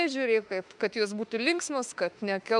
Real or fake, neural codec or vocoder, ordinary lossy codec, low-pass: fake; autoencoder, 48 kHz, 32 numbers a frame, DAC-VAE, trained on Japanese speech; MP3, 96 kbps; 10.8 kHz